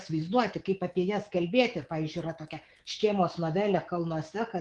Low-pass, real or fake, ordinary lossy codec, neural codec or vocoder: 10.8 kHz; fake; Opus, 24 kbps; codec, 24 kHz, 3.1 kbps, DualCodec